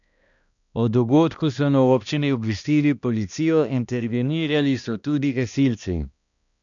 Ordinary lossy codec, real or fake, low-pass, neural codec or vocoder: none; fake; 7.2 kHz; codec, 16 kHz, 1 kbps, X-Codec, HuBERT features, trained on balanced general audio